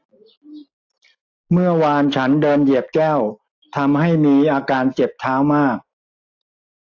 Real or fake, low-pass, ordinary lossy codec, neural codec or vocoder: real; 7.2 kHz; AAC, 48 kbps; none